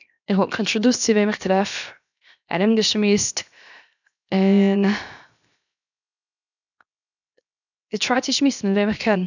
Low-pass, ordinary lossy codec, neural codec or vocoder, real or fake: 7.2 kHz; none; codec, 16 kHz, 0.7 kbps, FocalCodec; fake